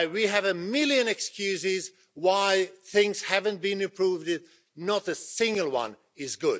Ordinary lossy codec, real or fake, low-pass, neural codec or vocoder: none; real; none; none